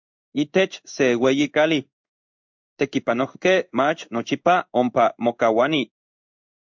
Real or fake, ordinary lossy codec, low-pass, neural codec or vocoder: fake; MP3, 64 kbps; 7.2 kHz; codec, 16 kHz in and 24 kHz out, 1 kbps, XY-Tokenizer